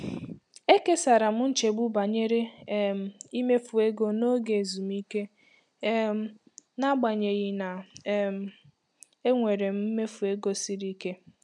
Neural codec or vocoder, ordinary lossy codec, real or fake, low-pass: none; none; real; 10.8 kHz